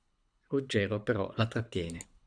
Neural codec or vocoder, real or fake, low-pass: codec, 24 kHz, 6 kbps, HILCodec; fake; 9.9 kHz